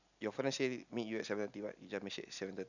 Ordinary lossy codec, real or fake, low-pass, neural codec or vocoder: none; real; 7.2 kHz; none